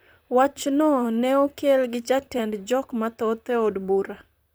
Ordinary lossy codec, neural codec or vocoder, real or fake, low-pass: none; vocoder, 44.1 kHz, 128 mel bands, Pupu-Vocoder; fake; none